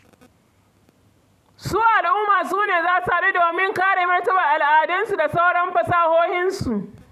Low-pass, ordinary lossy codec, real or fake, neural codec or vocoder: 14.4 kHz; none; fake; vocoder, 44.1 kHz, 128 mel bands every 512 samples, BigVGAN v2